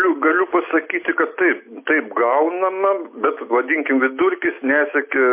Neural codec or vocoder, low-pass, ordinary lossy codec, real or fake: none; 3.6 kHz; MP3, 24 kbps; real